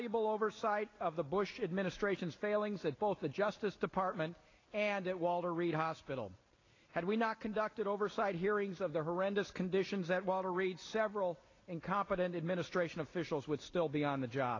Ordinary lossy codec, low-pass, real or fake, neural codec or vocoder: AAC, 32 kbps; 7.2 kHz; real; none